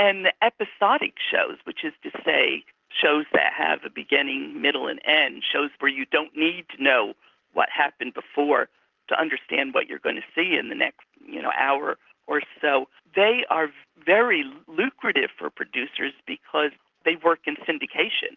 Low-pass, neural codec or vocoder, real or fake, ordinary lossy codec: 7.2 kHz; none; real; Opus, 32 kbps